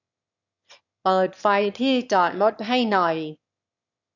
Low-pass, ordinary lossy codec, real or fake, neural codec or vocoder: 7.2 kHz; none; fake; autoencoder, 22.05 kHz, a latent of 192 numbers a frame, VITS, trained on one speaker